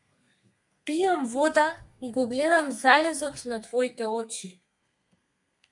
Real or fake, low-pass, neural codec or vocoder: fake; 10.8 kHz; codec, 32 kHz, 1.9 kbps, SNAC